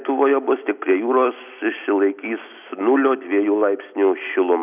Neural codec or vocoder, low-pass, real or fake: none; 3.6 kHz; real